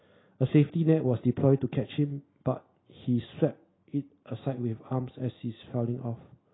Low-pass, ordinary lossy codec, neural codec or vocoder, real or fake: 7.2 kHz; AAC, 16 kbps; none; real